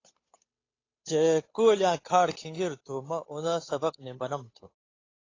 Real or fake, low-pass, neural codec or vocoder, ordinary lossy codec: fake; 7.2 kHz; codec, 16 kHz, 8 kbps, FunCodec, trained on Chinese and English, 25 frames a second; AAC, 32 kbps